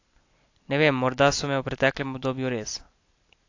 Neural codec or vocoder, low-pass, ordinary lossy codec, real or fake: none; 7.2 kHz; AAC, 48 kbps; real